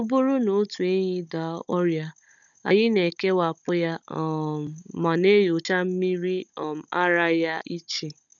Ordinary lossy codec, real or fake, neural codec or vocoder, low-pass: none; fake; codec, 16 kHz, 16 kbps, FunCodec, trained on Chinese and English, 50 frames a second; 7.2 kHz